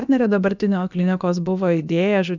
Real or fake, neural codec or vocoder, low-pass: fake; codec, 16 kHz, about 1 kbps, DyCAST, with the encoder's durations; 7.2 kHz